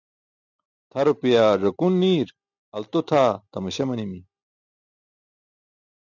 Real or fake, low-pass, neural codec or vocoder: real; 7.2 kHz; none